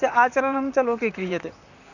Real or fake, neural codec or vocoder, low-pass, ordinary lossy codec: fake; vocoder, 44.1 kHz, 128 mel bands, Pupu-Vocoder; 7.2 kHz; none